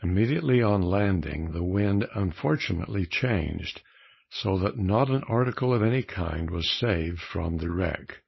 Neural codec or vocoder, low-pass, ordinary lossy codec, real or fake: codec, 16 kHz, 4.8 kbps, FACodec; 7.2 kHz; MP3, 24 kbps; fake